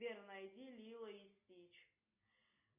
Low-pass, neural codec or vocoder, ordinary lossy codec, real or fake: 3.6 kHz; none; MP3, 32 kbps; real